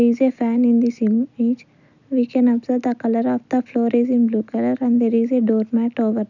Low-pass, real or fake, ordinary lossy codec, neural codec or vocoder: 7.2 kHz; real; none; none